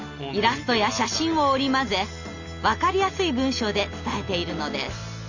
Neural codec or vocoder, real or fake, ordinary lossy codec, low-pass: none; real; none; 7.2 kHz